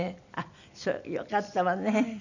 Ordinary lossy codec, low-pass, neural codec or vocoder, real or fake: none; 7.2 kHz; none; real